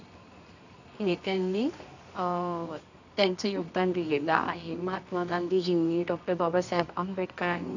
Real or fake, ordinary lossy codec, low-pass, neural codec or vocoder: fake; none; 7.2 kHz; codec, 24 kHz, 0.9 kbps, WavTokenizer, medium music audio release